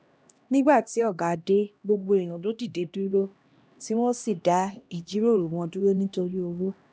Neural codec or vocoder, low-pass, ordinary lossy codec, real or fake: codec, 16 kHz, 1 kbps, X-Codec, HuBERT features, trained on LibriSpeech; none; none; fake